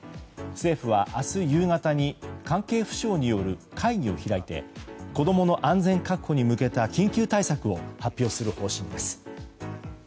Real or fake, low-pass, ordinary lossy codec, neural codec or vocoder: real; none; none; none